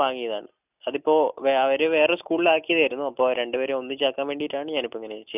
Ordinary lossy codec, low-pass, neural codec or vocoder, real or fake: none; 3.6 kHz; none; real